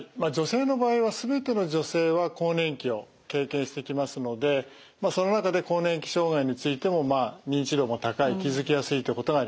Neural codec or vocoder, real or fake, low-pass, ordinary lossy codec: none; real; none; none